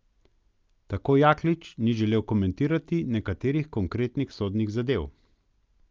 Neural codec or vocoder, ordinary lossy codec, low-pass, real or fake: none; Opus, 24 kbps; 7.2 kHz; real